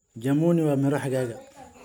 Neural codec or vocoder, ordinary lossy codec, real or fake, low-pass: none; none; real; none